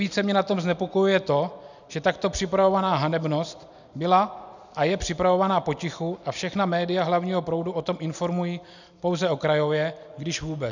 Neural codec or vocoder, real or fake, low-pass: none; real; 7.2 kHz